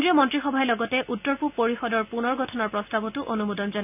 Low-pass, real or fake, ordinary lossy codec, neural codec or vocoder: 3.6 kHz; real; none; none